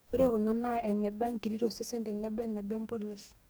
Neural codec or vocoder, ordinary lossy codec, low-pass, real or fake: codec, 44.1 kHz, 2.6 kbps, DAC; none; none; fake